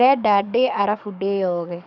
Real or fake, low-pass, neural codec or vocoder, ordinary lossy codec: fake; 7.2 kHz; codec, 44.1 kHz, 7.8 kbps, DAC; none